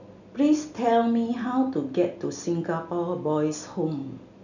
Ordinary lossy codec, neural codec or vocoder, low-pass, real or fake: none; none; 7.2 kHz; real